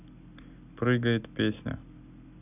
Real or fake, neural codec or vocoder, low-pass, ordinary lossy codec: real; none; 3.6 kHz; none